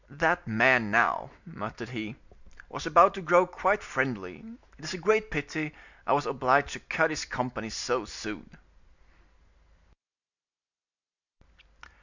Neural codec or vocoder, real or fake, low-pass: none; real; 7.2 kHz